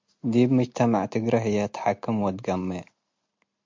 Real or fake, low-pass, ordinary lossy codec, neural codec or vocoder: real; 7.2 kHz; MP3, 48 kbps; none